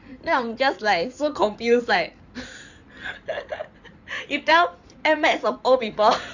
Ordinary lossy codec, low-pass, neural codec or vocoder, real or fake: none; 7.2 kHz; codec, 16 kHz in and 24 kHz out, 2.2 kbps, FireRedTTS-2 codec; fake